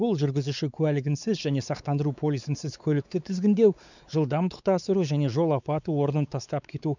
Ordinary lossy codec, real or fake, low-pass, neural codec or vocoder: none; fake; 7.2 kHz; codec, 16 kHz, 4 kbps, X-Codec, WavLM features, trained on Multilingual LibriSpeech